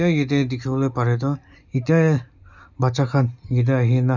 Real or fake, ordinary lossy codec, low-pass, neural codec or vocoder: real; none; 7.2 kHz; none